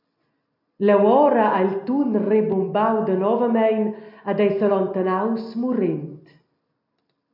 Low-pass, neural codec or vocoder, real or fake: 5.4 kHz; none; real